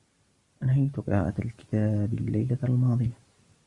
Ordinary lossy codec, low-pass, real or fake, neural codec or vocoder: Opus, 64 kbps; 10.8 kHz; real; none